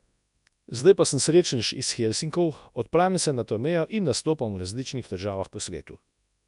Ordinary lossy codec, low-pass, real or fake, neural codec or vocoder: none; 10.8 kHz; fake; codec, 24 kHz, 0.9 kbps, WavTokenizer, large speech release